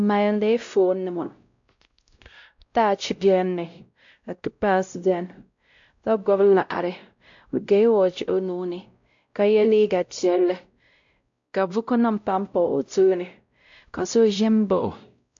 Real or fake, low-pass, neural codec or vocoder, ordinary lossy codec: fake; 7.2 kHz; codec, 16 kHz, 0.5 kbps, X-Codec, WavLM features, trained on Multilingual LibriSpeech; AAC, 64 kbps